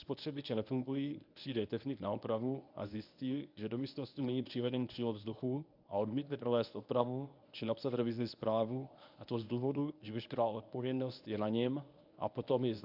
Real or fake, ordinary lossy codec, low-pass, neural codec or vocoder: fake; MP3, 48 kbps; 5.4 kHz; codec, 24 kHz, 0.9 kbps, WavTokenizer, medium speech release version 1